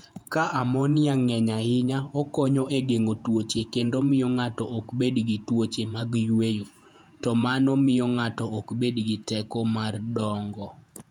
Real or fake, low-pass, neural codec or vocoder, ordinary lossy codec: fake; 19.8 kHz; vocoder, 48 kHz, 128 mel bands, Vocos; none